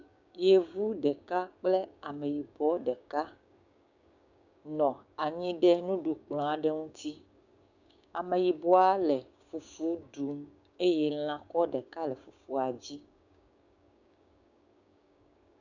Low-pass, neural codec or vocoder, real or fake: 7.2 kHz; codec, 16 kHz, 6 kbps, DAC; fake